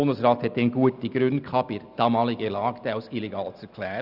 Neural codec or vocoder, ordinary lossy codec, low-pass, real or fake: none; none; 5.4 kHz; real